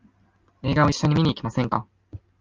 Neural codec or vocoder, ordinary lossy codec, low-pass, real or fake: none; Opus, 24 kbps; 7.2 kHz; real